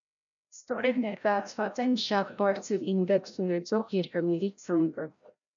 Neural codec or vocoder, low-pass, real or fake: codec, 16 kHz, 0.5 kbps, FreqCodec, larger model; 7.2 kHz; fake